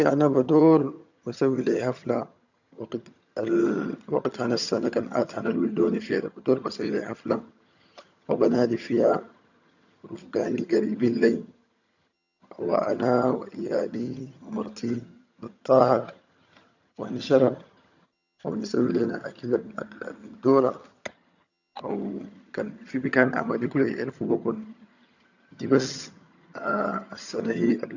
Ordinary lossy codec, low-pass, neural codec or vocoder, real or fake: none; 7.2 kHz; vocoder, 22.05 kHz, 80 mel bands, HiFi-GAN; fake